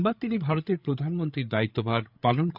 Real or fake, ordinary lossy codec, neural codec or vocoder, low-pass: fake; none; codec, 16 kHz, 8 kbps, FreqCodec, larger model; 5.4 kHz